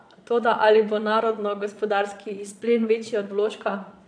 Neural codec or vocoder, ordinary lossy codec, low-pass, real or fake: vocoder, 22.05 kHz, 80 mel bands, Vocos; AAC, 64 kbps; 9.9 kHz; fake